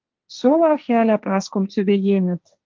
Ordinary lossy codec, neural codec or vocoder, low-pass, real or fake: Opus, 32 kbps; codec, 16 kHz, 1.1 kbps, Voila-Tokenizer; 7.2 kHz; fake